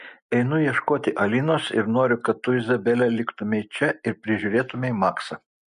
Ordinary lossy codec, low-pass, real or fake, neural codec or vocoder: MP3, 48 kbps; 14.4 kHz; real; none